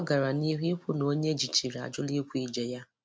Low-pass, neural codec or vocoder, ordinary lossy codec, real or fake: none; none; none; real